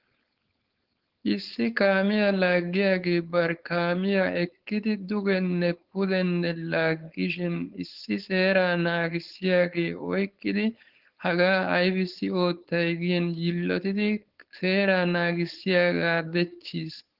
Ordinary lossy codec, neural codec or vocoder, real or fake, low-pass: Opus, 16 kbps; codec, 16 kHz, 4.8 kbps, FACodec; fake; 5.4 kHz